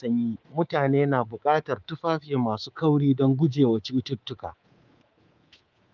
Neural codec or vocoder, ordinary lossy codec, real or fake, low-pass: codec, 24 kHz, 3.1 kbps, DualCodec; Opus, 32 kbps; fake; 7.2 kHz